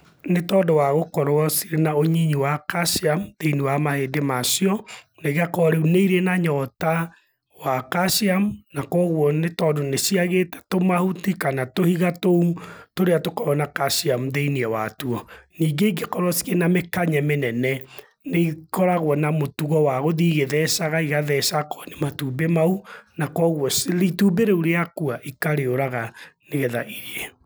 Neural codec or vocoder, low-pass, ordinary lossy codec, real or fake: none; none; none; real